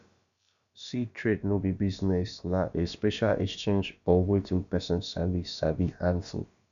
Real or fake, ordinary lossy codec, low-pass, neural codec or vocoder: fake; none; 7.2 kHz; codec, 16 kHz, about 1 kbps, DyCAST, with the encoder's durations